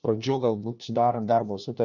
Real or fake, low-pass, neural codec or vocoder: fake; 7.2 kHz; codec, 16 kHz, 1 kbps, FunCodec, trained on LibriTTS, 50 frames a second